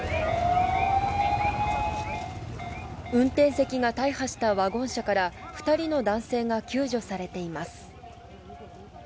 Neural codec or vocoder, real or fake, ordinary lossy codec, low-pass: none; real; none; none